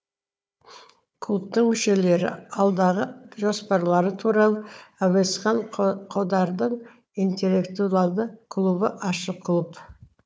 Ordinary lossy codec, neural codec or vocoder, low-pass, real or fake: none; codec, 16 kHz, 4 kbps, FunCodec, trained on Chinese and English, 50 frames a second; none; fake